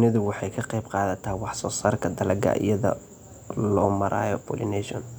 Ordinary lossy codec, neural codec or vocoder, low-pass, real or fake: none; none; none; real